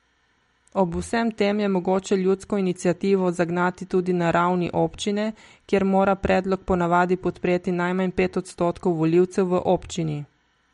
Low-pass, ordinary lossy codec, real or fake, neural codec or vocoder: 10.8 kHz; MP3, 48 kbps; real; none